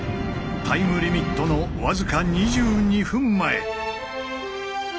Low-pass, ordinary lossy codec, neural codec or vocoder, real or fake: none; none; none; real